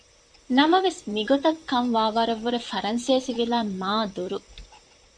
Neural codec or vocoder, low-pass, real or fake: vocoder, 44.1 kHz, 128 mel bands, Pupu-Vocoder; 9.9 kHz; fake